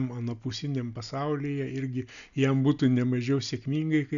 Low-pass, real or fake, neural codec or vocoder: 7.2 kHz; real; none